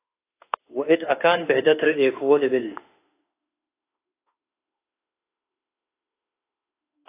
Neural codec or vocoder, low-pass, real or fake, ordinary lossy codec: autoencoder, 48 kHz, 32 numbers a frame, DAC-VAE, trained on Japanese speech; 3.6 kHz; fake; AAC, 24 kbps